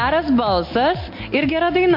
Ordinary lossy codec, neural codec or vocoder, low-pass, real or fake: MP3, 32 kbps; none; 5.4 kHz; real